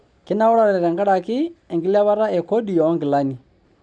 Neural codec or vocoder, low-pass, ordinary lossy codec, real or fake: none; 9.9 kHz; none; real